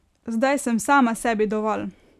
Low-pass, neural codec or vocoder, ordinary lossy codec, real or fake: 14.4 kHz; none; none; real